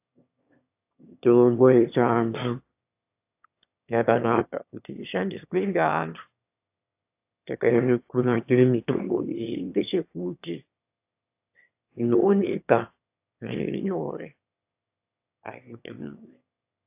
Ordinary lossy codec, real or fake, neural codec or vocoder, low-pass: AAC, 32 kbps; fake; autoencoder, 22.05 kHz, a latent of 192 numbers a frame, VITS, trained on one speaker; 3.6 kHz